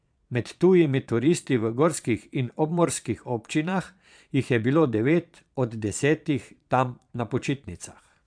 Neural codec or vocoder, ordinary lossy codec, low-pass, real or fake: vocoder, 22.05 kHz, 80 mel bands, Vocos; MP3, 96 kbps; 9.9 kHz; fake